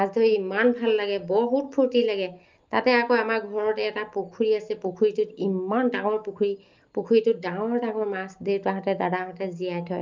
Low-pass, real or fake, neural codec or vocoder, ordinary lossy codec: 7.2 kHz; real; none; Opus, 32 kbps